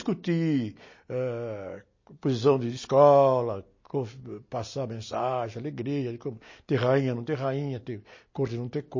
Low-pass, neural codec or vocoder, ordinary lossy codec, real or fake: 7.2 kHz; none; MP3, 32 kbps; real